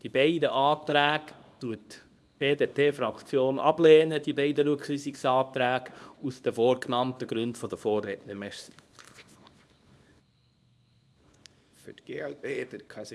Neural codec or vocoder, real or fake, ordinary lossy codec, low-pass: codec, 24 kHz, 0.9 kbps, WavTokenizer, small release; fake; none; none